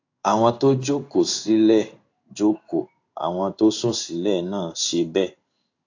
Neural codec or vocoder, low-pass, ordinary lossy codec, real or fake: codec, 16 kHz in and 24 kHz out, 1 kbps, XY-Tokenizer; 7.2 kHz; AAC, 48 kbps; fake